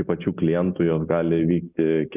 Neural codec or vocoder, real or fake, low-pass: none; real; 3.6 kHz